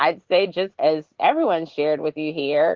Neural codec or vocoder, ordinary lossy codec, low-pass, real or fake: vocoder, 44.1 kHz, 80 mel bands, Vocos; Opus, 16 kbps; 7.2 kHz; fake